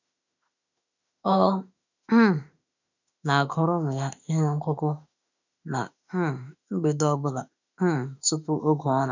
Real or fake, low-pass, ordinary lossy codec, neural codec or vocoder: fake; 7.2 kHz; none; autoencoder, 48 kHz, 32 numbers a frame, DAC-VAE, trained on Japanese speech